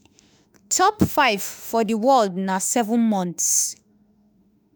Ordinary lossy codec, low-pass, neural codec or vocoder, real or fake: none; none; autoencoder, 48 kHz, 32 numbers a frame, DAC-VAE, trained on Japanese speech; fake